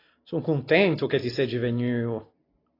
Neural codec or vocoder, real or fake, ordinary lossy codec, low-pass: none; real; AAC, 24 kbps; 5.4 kHz